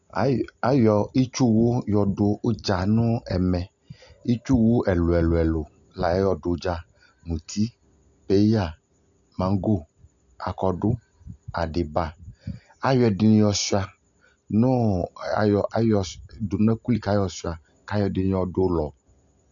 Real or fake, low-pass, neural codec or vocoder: real; 7.2 kHz; none